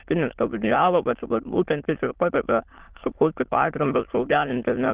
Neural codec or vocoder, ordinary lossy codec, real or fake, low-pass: autoencoder, 22.05 kHz, a latent of 192 numbers a frame, VITS, trained on many speakers; Opus, 16 kbps; fake; 3.6 kHz